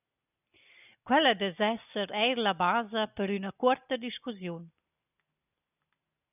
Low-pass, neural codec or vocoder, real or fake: 3.6 kHz; none; real